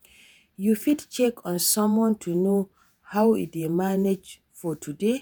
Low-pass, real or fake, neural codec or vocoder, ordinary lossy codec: none; fake; vocoder, 48 kHz, 128 mel bands, Vocos; none